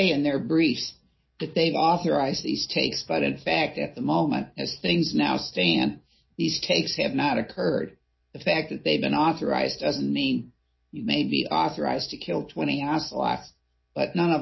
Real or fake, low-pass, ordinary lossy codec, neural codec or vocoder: real; 7.2 kHz; MP3, 24 kbps; none